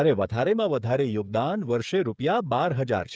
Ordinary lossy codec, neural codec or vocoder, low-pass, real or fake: none; codec, 16 kHz, 8 kbps, FreqCodec, smaller model; none; fake